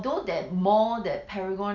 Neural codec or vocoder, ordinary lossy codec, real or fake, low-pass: none; none; real; 7.2 kHz